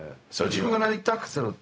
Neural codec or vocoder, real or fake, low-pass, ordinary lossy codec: codec, 16 kHz, 0.4 kbps, LongCat-Audio-Codec; fake; none; none